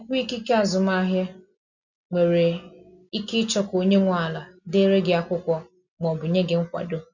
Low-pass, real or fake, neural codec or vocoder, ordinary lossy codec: 7.2 kHz; real; none; none